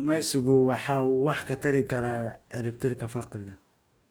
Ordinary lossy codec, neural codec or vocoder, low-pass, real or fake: none; codec, 44.1 kHz, 2.6 kbps, DAC; none; fake